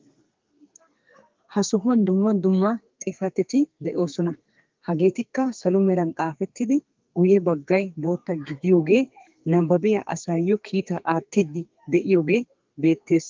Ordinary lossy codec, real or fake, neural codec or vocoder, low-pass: Opus, 32 kbps; fake; codec, 32 kHz, 1.9 kbps, SNAC; 7.2 kHz